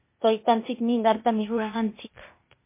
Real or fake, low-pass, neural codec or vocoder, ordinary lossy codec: fake; 3.6 kHz; codec, 16 kHz, 0.8 kbps, ZipCodec; MP3, 24 kbps